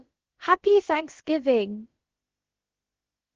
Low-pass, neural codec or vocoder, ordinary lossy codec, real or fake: 7.2 kHz; codec, 16 kHz, about 1 kbps, DyCAST, with the encoder's durations; Opus, 16 kbps; fake